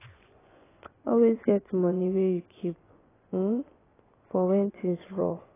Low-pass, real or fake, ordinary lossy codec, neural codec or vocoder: 3.6 kHz; fake; AAC, 16 kbps; vocoder, 24 kHz, 100 mel bands, Vocos